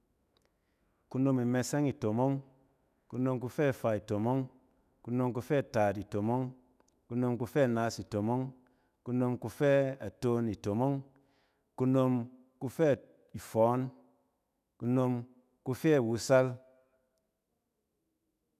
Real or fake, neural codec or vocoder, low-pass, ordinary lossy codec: fake; autoencoder, 48 kHz, 128 numbers a frame, DAC-VAE, trained on Japanese speech; 14.4 kHz; none